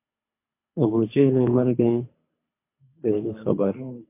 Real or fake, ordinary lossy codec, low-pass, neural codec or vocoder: fake; MP3, 24 kbps; 3.6 kHz; codec, 24 kHz, 3 kbps, HILCodec